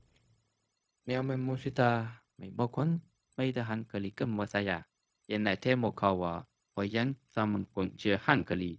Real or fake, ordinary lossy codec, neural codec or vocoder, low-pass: fake; none; codec, 16 kHz, 0.4 kbps, LongCat-Audio-Codec; none